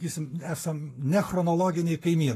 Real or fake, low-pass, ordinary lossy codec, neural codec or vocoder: fake; 14.4 kHz; AAC, 48 kbps; codec, 44.1 kHz, 7.8 kbps, Pupu-Codec